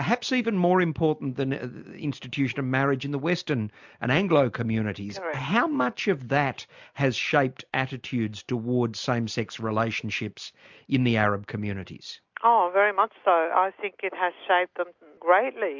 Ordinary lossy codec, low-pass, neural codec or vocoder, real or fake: MP3, 64 kbps; 7.2 kHz; none; real